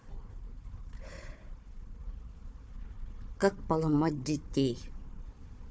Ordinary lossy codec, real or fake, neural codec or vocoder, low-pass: none; fake; codec, 16 kHz, 4 kbps, FunCodec, trained on Chinese and English, 50 frames a second; none